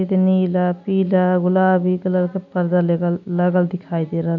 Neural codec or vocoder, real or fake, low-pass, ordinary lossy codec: none; real; 7.2 kHz; none